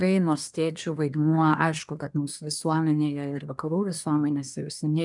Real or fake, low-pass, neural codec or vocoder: fake; 10.8 kHz; codec, 24 kHz, 1 kbps, SNAC